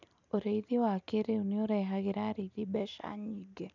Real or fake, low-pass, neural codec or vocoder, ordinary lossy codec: real; 7.2 kHz; none; none